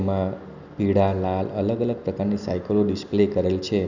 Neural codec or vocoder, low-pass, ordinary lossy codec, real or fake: none; 7.2 kHz; none; real